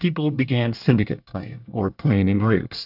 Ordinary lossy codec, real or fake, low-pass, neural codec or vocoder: AAC, 48 kbps; fake; 5.4 kHz; codec, 24 kHz, 1 kbps, SNAC